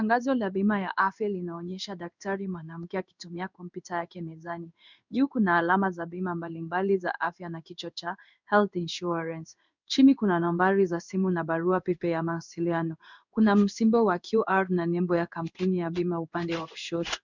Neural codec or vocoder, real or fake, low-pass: codec, 16 kHz in and 24 kHz out, 1 kbps, XY-Tokenizer; fake; 7.2 kHz